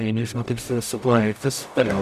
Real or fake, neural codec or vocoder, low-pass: fake; codec, 44.1 kHz, 0.9 kbps, DAC; 14.4 kHz